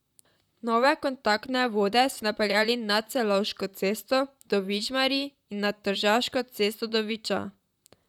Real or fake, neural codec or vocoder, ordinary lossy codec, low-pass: fake; vocoder, 44.1 kHz, 128 mel bands, Pupu-Vocoder; none; 19.8 kHz